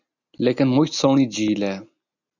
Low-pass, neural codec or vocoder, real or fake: 7.2 kHz; none; real